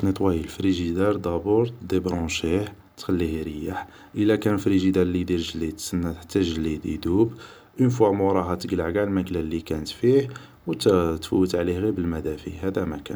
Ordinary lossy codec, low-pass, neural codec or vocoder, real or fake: none; none; none; real